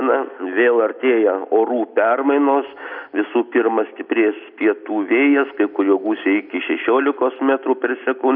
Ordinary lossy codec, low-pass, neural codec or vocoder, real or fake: AAC, 48 kbps; 5.4 kHz; none; real